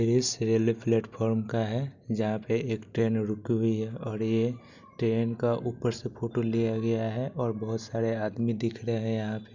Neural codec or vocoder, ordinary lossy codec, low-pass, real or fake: none; none; 7.2 kHz; real